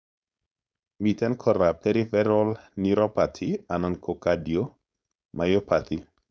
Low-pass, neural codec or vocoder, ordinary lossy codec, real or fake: none; codec, 16 kHz, 4.8 kbps, FACodec; none; fake